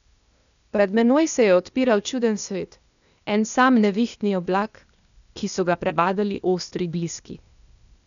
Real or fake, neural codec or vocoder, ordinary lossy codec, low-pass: fake; codec, 16 kHz, 0.8 kbps, ZipCodec; none; 7.2 kHz